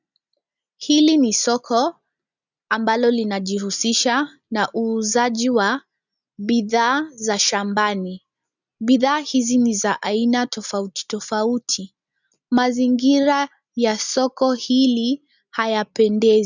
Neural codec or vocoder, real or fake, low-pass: none; real; 7.2 kHz